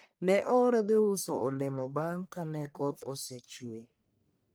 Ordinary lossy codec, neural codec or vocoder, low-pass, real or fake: none; codec, 44.1 kHz, 1.7 kbps, Pupu-Codec; none; fake